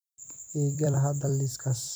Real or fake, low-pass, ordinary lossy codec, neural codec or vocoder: fake; none; none; vocoder, 44.1 kHz, 128 mel bands every 256 samples, BigVGAN v2